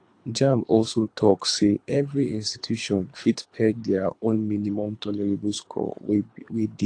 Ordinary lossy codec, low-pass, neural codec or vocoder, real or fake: AAC, 48 kbps; 9.9 kHz; codec, 24 kHz, 3 kbps, HILCodec; fake